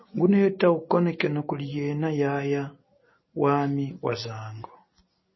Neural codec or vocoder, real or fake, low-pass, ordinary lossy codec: none; real; 7.2 kHz; MP3, 24 kbps